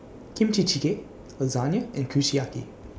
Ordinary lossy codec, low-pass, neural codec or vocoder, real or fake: none; none; none; real